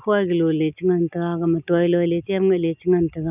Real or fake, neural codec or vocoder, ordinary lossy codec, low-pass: real; none; none; 3.6 kHz